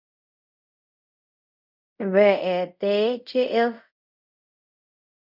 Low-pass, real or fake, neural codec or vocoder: 5.4 kHz; fake; codec, 24 kHz, 0.5 kbps, DualCodec